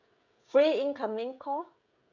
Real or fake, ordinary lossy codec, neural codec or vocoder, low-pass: fake; none; codec, 16 kHz, 16 kbps, FreqCodec, smaller model; 7.2 kHz